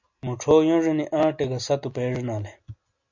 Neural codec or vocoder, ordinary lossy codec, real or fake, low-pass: none; MP3, 48 kbps; real; 7.2 kHz